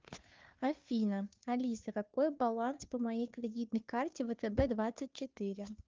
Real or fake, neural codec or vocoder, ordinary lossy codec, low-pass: fake; codec, 16 kHz, 2 kbps, FunCodec, trained on Chinese and English, 25 frames a second; Opus, 32 kbps; 7.2 kHz